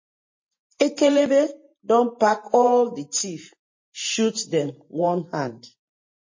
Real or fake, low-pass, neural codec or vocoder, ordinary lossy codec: fake; 7.2 kHz; vocoder, 44.1 kHz, 128 mel bands every 512 samples, BigVGAN v2; MP3, 32 kbps